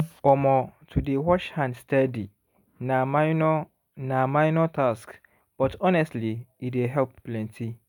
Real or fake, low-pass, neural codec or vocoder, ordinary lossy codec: real; 19.8 kHz; none; none